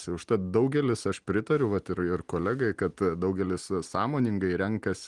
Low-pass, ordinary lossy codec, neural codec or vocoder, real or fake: 10.8 kHz; Opus, 24 kbps; none; real